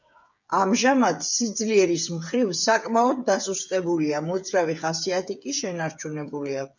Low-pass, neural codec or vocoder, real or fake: 7.2 kHz; codec, 16 kHz, 8 kbps, FreqCodec, smaller model; fake